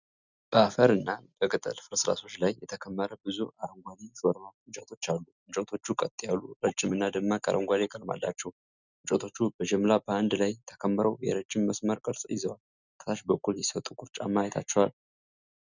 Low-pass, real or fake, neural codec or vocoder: 7.2 kHz; real; none